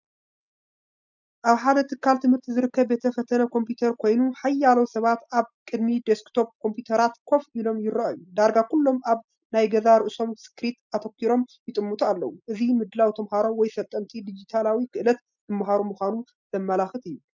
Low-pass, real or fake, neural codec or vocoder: 7.2 kHz; real; none